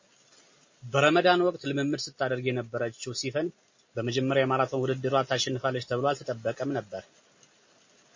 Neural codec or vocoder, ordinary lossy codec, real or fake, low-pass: none; MP3, 32 kbps; real; 7.2 kHz